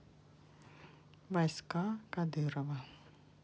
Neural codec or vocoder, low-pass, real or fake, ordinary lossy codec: none; none; real; none